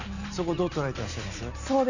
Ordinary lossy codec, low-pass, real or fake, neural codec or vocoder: none; 7.2 kHz; real; none